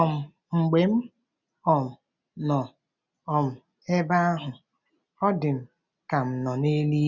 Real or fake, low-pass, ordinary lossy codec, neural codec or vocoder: real; 7.2 kHz; none; none